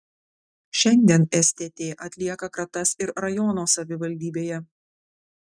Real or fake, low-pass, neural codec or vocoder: real; 9.9 kHz; none